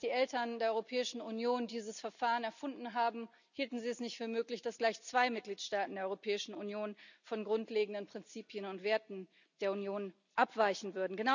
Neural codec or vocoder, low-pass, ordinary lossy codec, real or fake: none; 7.2 kHz; none; real